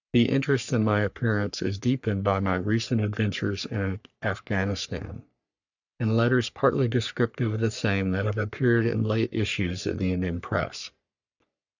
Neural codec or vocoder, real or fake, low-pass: codec, 44.1 kHz, 3.4 kbps, Pupu-Codec; fake; 7.2 kHz